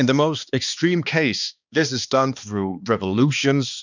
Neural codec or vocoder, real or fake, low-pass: codec, 16 kHz, 2 kbps, X-Codec, HuBERT features, trained on balanced general audio; fake; 7.2 kHz